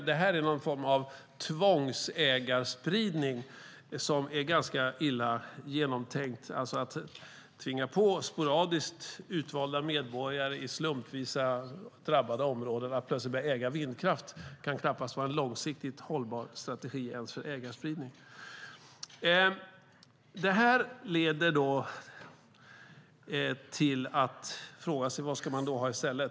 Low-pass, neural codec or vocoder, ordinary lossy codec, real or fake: none; none; none; real